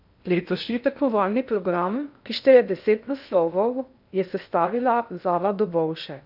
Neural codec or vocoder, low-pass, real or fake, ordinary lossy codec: codec, 16 kHz in and 24 kHz out, 0.6 kbps, FocalCodec, streaming, 2048 codes; 5.4 kHz; fake; none